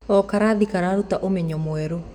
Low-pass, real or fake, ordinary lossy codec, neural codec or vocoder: 19.8 kHz; fake; none; autoencoder, 48 kHz, 128 numbers a frame, DAC-VAE, trained on Japanese speech